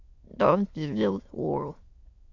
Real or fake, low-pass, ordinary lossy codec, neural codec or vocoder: fake; 7.2 kHz; Opus, 64 kbps; autoencoder, 22.05 kHz, a latent of 192 numbers a frame, VITS, trained on many speakers